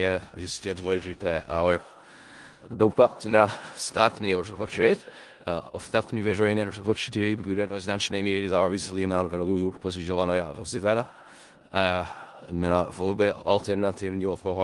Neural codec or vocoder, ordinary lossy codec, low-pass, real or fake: codec, 16 kHz in and 24 kHz out, 0.4 kbps, LongCat-Audio-Codec, four codebook decoder; Opus, 24 kbps; 10.8 kHz; fake